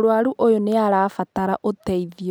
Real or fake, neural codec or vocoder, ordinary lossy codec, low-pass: real; none; none; none